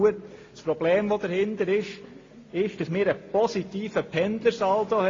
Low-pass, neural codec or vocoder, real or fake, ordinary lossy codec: 7.2 kHz; none; real; AAC, 32 kbps